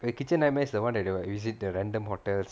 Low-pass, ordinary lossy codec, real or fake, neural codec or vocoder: none; none; real; none